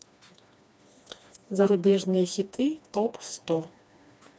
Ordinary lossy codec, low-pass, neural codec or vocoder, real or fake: none; none; codec, 16 kHz, 2 kbps, FreqCodec, smaller model; fake